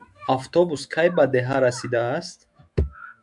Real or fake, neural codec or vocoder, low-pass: fake; autoencoder, 48 kHz, 128 numbers a frame, DAC-VAE, trained on Japanese speech; 10.8 kHz